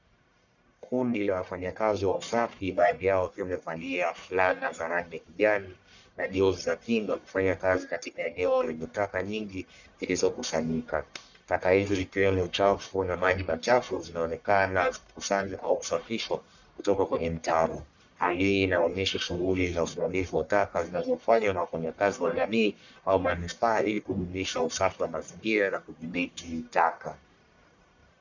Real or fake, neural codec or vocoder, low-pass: fake; codec, 44.1 kHz, 1.7 kbps, Pupu-Codec; 7.2 kHz